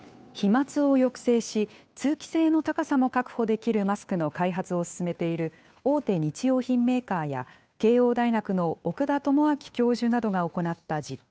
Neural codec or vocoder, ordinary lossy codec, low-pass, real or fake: codec, 16 kHz, 2 kbps, FunCodec, trained on Chinese and English, 25 frames a second; none; none; fake